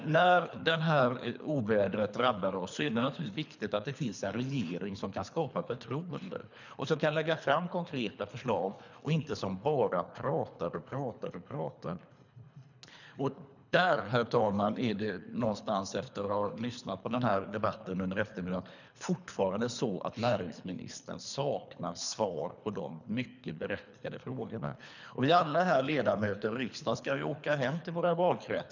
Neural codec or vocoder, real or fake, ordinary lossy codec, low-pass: codec, 24 kHz, 3 kbps, HILCodec; fake; none; 7.2 kHz